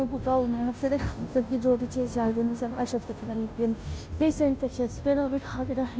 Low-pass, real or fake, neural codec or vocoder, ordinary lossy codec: none; fake; codec, 16 kHz, 0.5 kbps, FunCodec, trained on Chinese and English, 25 frames a second; none